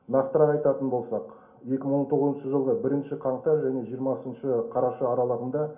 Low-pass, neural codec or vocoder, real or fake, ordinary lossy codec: 3.6 kHz; none; real; none